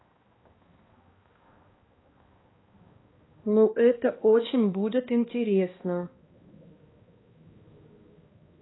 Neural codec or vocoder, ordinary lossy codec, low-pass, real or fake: codec, 16 kHz, 1 kbps, X-Codec, HuBERT features, trained on balanced general audio; AAC, 16 kbps; 7.2 kHz; fake